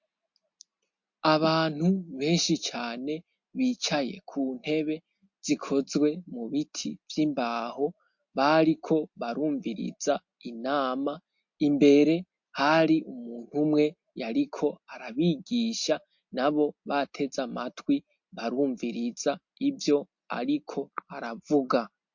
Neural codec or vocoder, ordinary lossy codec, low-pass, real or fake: none; MP3, 48 kbps; 7.2 kHz; real